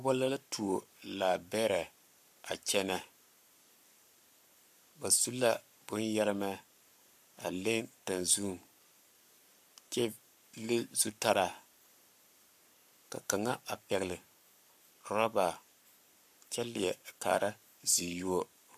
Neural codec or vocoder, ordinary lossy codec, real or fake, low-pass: codec, 44.1 kHz, 7.8 kbps, Pupu-Codec; MP3, 96 kbps; fake; 14.4 kHz